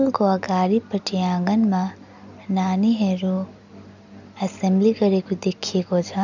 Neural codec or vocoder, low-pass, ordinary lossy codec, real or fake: none; 7.2 kHz; none; real